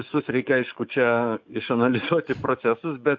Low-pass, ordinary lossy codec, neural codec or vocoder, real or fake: 7.2 kHz; MP3, 64 kbps; codec, 44.1 kHz, 7.8 kbps, DAC; fake